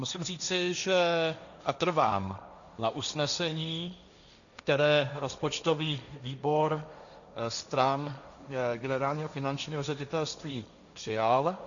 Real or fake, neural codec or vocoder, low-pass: fake; codec, 16 kHz, 1.1 kbps, Voila-Tokenizer; 7.2 kHz